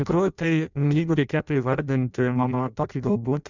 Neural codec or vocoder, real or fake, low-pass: codec, 16 kHz in and 24 kHz out, 0.6 kbps, FireRedTTS-2 codec; fake; 7.2 kHz